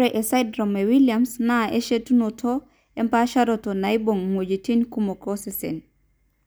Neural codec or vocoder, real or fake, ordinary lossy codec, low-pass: none; real; none; none